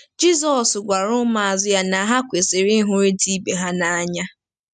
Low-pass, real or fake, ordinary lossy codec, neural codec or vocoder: 10.8 kHz; real; MP3, 96 kbps; none